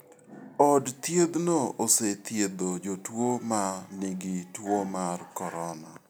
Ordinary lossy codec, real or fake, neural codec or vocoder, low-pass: none; real; none; none